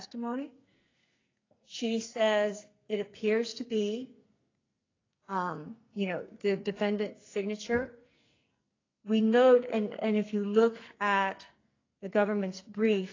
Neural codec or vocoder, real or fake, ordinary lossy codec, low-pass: codec, 44.1 kHz, 2.6 kbps, SNAC; fake; AAC, 32 kbps; 7.2 kHz